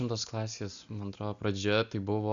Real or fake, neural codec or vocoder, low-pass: real; none; 7.2 kHz